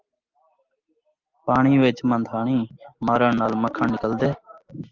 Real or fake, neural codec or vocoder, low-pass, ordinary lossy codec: real; none; 7.2 kHz; Opus, 32 kbps